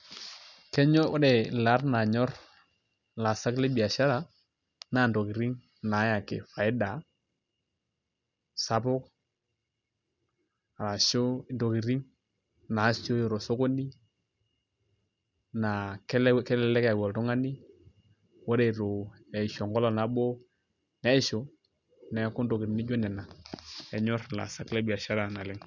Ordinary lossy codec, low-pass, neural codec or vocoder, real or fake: none; 7.2 kHz; none; real